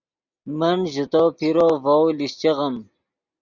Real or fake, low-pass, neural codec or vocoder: real; 7.2 kHz; none